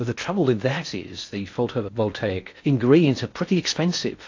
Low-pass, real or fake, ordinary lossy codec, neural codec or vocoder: 7.2 kHz; fake; AAC, 48 kbps; codec, 16 kHz in and 24 kHz out, 0.6 kbps, FocalCodec, streaming, 4096 codes